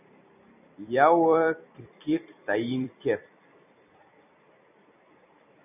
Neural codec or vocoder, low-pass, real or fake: vocoder, 44.1 kHz, 128 mel bands every 256 samples, BigVGAN v2; 3.6 kHz; fake